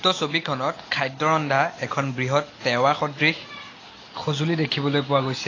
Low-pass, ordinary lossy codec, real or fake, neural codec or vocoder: 7.2 kHz; AAC, 32 kbps; real; none